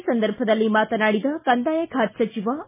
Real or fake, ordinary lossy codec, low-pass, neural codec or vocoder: real; MP3, 16 kbps; 3.6 kHz; none